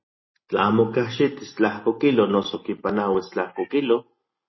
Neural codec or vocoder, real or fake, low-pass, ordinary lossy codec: none; real; 7.2 kHz; MP3, 24 kbps